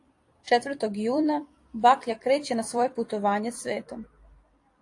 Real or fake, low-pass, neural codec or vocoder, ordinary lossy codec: fake; 10.8 kHz; vocoder, 44.1 kHz, 128 mel bands every 256 samples, BigVGAN v2; AAC, 48 kbps